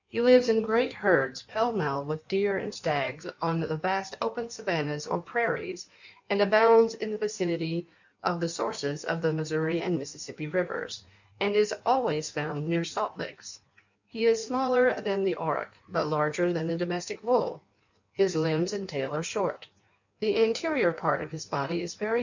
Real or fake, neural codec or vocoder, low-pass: fake; codec, 16 kHz in and 24 kHz out, 1.1 kbps, FireRedTTS-2 codec; 7.2 kHz